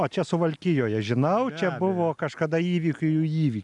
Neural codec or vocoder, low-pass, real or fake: none; 10.8 kHz; real